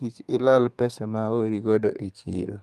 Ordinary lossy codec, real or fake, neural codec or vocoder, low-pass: Opus, 32 kbps; fake; codec, 32 kHz, 1.9 kbps, SNAC; 14.4 kHz